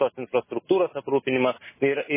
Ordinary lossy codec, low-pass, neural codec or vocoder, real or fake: MP3, 16 kbps; 3.6 kHz; none; real